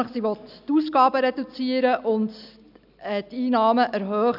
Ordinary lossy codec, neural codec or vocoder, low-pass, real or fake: none; none; 5.4 kHz; real